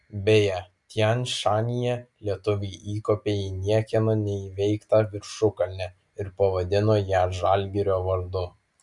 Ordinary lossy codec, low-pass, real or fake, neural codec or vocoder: Opus, 64 kbps; 10.8 kHz; real; none